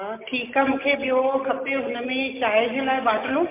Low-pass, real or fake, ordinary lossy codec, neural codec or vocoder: 3.6 kHz; real; MP3, 32 kbps; none